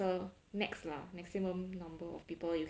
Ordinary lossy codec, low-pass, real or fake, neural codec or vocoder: none; none; real; none